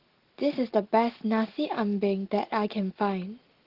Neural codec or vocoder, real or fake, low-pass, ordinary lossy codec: none; real; 5.4 kHz; Opus, 16 kbps